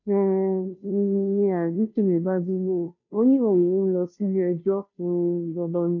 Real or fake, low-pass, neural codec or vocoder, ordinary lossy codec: fake; 7.2 kHz; codec, 16 kHz, 0.5 kbps, FunCodec, trained on Chinese and English, 25 frames a second; none